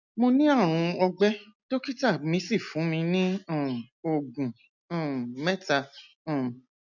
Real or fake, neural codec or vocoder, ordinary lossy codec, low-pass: real; none; none; 7.2 kHz